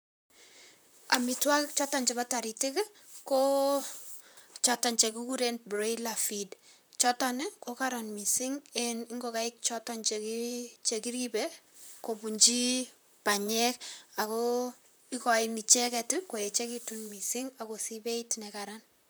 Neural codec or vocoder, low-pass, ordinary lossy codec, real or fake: vocoder, 44.1 kHz, 128 mel bands, Pupu-Vocoder; none; none; fake